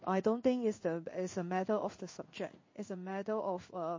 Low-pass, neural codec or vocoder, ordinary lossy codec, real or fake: 7.2 kHz; codec, 16 kHz in and 24 kHz out, 1 kbps, XY-Tokenizer; MP3, 32 kbps; fake